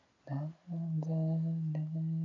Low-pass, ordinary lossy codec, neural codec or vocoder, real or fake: 7.2 kHz; MP3, 96 kbps; none; real